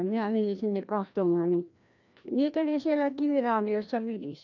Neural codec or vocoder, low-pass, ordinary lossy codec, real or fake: codec, 16 kHz, 1 kbps, FreqCodec, larger model; 7.2 kHz; none; fake